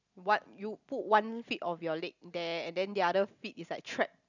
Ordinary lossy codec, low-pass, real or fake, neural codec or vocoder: none; 7.2 kHz; real; none